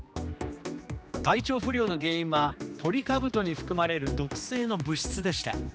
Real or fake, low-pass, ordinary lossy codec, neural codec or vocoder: fake; none; none; codec, 16 kHz, 2 kbps, X-Codec, HuBERT features, trained on general audio